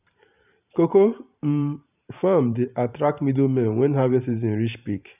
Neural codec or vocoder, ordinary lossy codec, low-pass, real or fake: vocoder, 44.1 kHz, 128 mel bands every 512 samples, BigVGAN v2; none; 3.6 kHz; fake